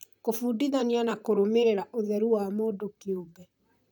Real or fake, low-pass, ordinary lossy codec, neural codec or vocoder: fake; none; none; vocoder, 44.1 kHz, 128 mel bands, Pupu-Vocoder